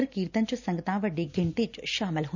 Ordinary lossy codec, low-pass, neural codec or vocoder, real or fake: none; 7.2 kHz; none; real